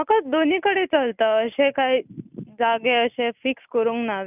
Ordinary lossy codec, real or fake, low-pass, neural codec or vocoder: none; real; 3.6 kHz; none